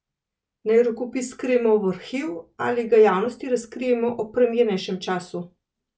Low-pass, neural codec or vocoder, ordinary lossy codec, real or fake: none; none; none; real